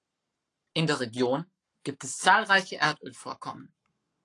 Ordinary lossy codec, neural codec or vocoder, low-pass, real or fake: AAC, 48 kbps; codec, 44.1 kHz, 7.8 kbps, Pupu-Codec; 10.8 kHz; fake